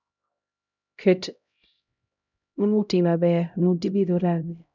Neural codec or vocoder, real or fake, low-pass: codec, 16 kHz, 0.5 kbps, X-Codec, HuBERT features, trained on LibriSpeech; fake; 7.2 kHz